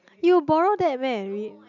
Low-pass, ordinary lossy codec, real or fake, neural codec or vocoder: 7.2 kHz; none; real; none